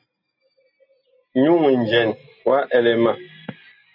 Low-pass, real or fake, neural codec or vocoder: 5.4 kHz; real; none